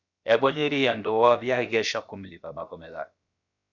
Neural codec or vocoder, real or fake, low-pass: codec, 16 kHz, about 1 kbps, DyCAST, with the encoder's durations; fake; 7.2 kHz